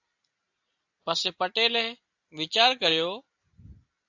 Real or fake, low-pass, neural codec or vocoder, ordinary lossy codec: real; 7.2 kHz; none; AAC, 48 kbps